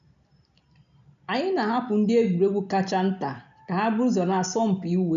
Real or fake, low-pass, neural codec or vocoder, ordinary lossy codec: real; 7.2 kHz; none; none